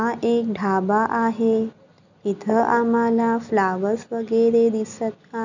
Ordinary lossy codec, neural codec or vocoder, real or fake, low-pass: none; none; real; 7.2 kHz